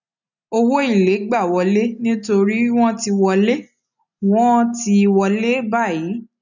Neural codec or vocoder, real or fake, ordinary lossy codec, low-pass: none; real; none; 7.2 kHz